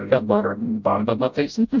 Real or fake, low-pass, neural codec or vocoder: fake; 7.2 kHz; codec, 16 kHz, 0.5 kbps, FreqCodec, smaller model